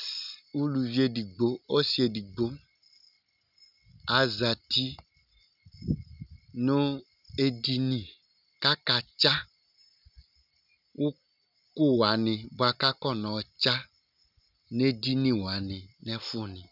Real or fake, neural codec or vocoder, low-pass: real; none; 5.4 kHz